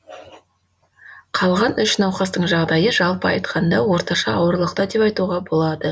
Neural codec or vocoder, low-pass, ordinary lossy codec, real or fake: none; none; none; real